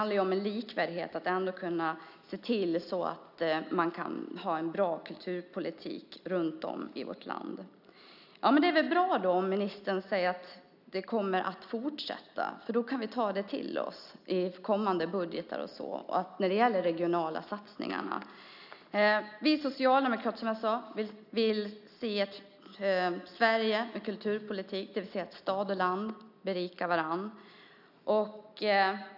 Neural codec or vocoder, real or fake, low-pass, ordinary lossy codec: none; real; 5.4 kHz; none